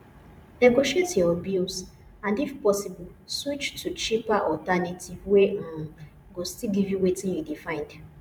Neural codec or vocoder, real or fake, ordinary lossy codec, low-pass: vocoder, 44.1 kHz, 128 mel bands every 512 samples, BigVGAN v2; fake; none; 19.8 kHz